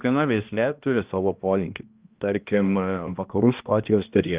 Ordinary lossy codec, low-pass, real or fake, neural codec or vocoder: Opus, 32 kbps; 3.6 kHz; fake; codec, 16 kHz, 1 kbps, X-Codec, HuBERT features, trained on balanced general audio